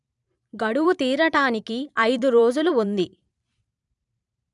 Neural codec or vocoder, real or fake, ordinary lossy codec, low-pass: vocoder, 44.1 kHz, 128 mel bands every 512 samples, BigVGAN v2; fake; none; 10.8 kHz